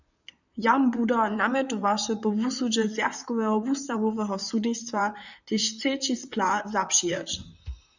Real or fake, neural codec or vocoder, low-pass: fake; vocoder, 44.1 kHz, 128 mel bands, Pupu-Vocoder; 7.2 kHz